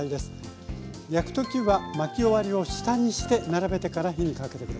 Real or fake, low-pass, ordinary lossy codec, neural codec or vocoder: real; none; none; none